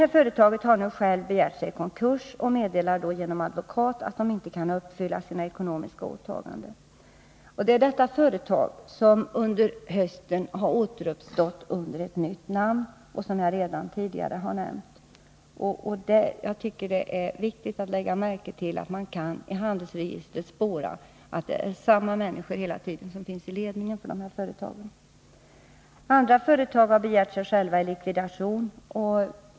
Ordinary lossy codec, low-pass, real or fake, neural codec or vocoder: none; none; real; none